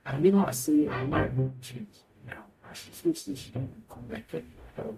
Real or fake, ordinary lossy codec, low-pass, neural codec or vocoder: fake; MP3, 64 kbps; 14.4 kHz; codec, 44.1 kHz, 0.9 kbps, DAC